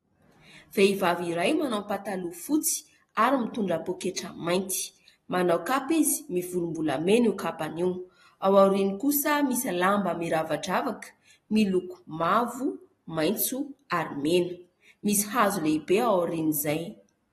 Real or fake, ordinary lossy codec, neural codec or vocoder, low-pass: real; AAC, 32 kbps; none; 19.8 kHz